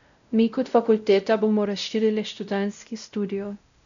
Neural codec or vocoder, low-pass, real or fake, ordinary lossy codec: codec, 16 kHz, 0.5 kbps, X-Codec, WavLM features, trained on Multilingual LibriSpeech; 7.2 kHz; fake; none